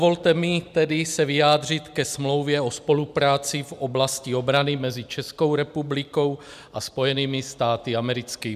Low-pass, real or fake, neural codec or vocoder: 14.4 kHz; real; none